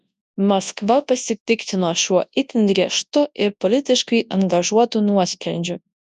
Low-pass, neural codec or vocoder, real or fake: 10.8 kHz; codec, 24 kHz, 0.9 kbps, WavTokenizer, large speech release; fake